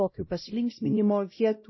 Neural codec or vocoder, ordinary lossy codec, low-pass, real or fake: codec, 16 kHz, 0.5 kbps, X-Codec, WavLM features, trained on Multilingual LibriSpeech; MP3, 24 kbps; 7.2 kHz; fake